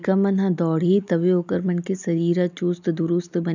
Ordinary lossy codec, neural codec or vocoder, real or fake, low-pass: none; none; real; 7.2 kHz